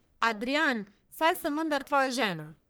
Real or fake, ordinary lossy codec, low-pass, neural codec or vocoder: fake; none; none; codec, 44.1 kHz, 1.7 kbps, Pupu-Codec